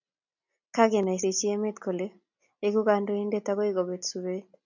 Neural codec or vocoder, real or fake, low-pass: none; real; 7.2 kHz